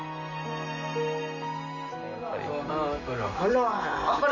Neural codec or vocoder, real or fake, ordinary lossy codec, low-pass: none; real; none; 7.2 kHz